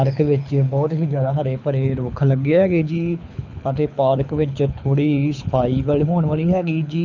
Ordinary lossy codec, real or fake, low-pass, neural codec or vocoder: none; fake; 7.2 kHz; codec, 24 kHz, 6 kbps, HILCodec